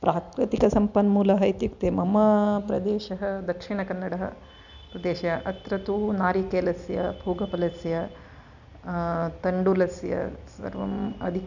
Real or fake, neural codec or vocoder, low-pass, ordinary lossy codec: real; none; 7.2 kHz; none